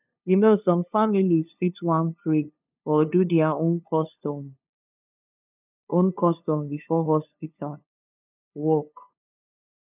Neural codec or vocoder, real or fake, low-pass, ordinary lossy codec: codec, 16 kHz, 2 kbps, FunCodec, trained on LibriTTS, 25 frames a second; fake; 3.6 kHz; none